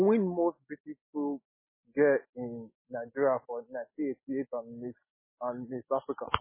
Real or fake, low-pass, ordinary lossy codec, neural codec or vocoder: real; 3.6 kHz; MP3, 16 kbps; none